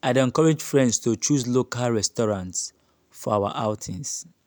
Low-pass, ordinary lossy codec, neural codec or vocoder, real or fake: none; none; none; real